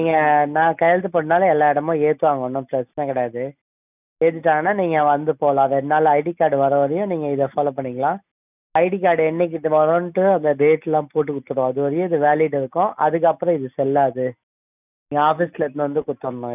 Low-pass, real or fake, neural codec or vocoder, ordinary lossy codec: 3.6 kHz; real; none; none